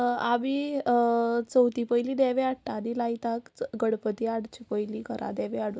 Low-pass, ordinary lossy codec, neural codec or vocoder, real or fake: none; none; none; real